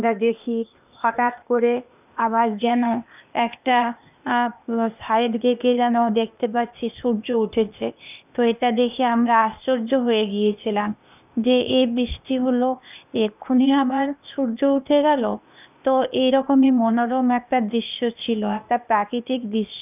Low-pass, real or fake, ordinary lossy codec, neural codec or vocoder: 3.6 kHz; fake; none; codec, 16 kHz, 0.8 kbps, ZipCodec